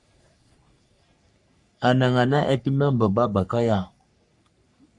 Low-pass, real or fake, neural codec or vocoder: 10.8 kHz; fake; codec, 44.1 kHz, 3.4 kbps, Pupu-Codec